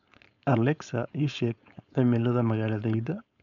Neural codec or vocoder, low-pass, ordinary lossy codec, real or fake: codec, 16 kHz, 4.8 kbps, FACodec; 7.2 kHz; none; fake